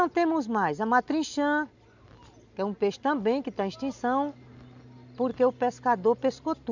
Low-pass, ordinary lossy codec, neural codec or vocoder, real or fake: 7.2 kHz; none; none; real